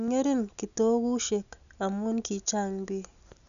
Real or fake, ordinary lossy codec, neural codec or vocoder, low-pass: real; none; none; 7.2 kHz